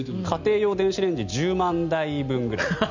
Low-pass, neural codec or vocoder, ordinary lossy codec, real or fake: 7.2 kHz; none; none; real